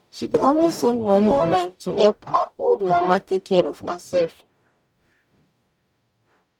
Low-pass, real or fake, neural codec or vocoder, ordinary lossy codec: 19.8 kHz; fake; codec, 44.1 kHz, 0.9 kbps, DAC; none